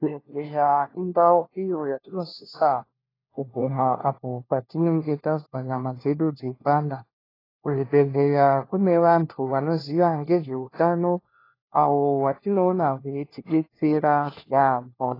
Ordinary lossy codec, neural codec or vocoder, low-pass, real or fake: AAC, 24 kbps; codec, 16 kHz, 1 kbps, FunCodec, trained on LibriTTS, 50 frames a second; 5.4 kHz; fake